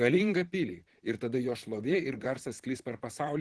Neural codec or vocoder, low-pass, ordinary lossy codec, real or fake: vocoder, 44.1 kHz, 128 mel bands, Pupu-Vocoder; 10.8 kHz; Opus, 16 kbps; fake